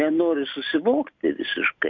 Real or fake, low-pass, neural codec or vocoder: real; 7.2 kHz; none